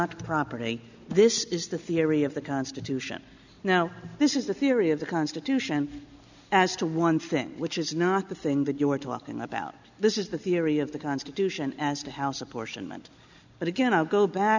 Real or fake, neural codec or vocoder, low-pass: real; none; 7.2 kHz